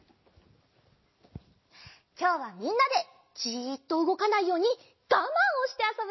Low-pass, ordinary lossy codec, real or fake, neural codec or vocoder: 7.2 kHz; MP3, 24 kbps; real; none